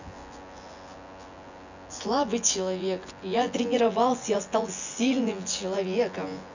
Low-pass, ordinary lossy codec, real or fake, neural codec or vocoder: 7.2 kHz; none; fake; vocoder, 24 kHz, 100 mel bands, Vocos